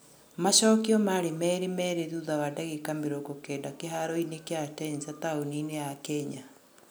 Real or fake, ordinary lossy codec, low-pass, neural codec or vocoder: real; none; none; none